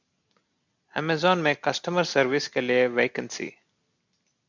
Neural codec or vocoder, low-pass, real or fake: none; 7.2 kHz; real